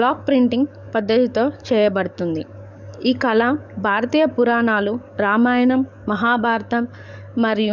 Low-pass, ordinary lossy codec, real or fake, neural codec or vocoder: 7.2 kHz; none; fake; codec, 44.1 kHz, 7.8 kbps, DAC